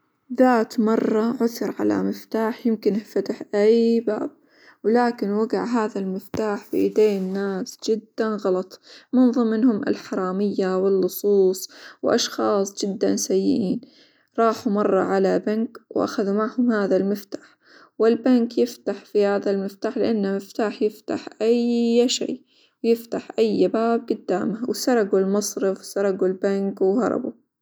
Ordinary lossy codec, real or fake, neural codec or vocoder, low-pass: none; real; none; none